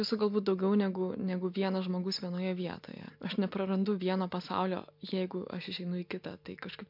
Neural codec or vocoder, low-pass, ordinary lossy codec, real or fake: none; 5.4 kHz; MP3, 48 kbps; real